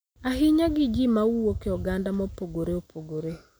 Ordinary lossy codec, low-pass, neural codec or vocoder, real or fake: none; none; none; real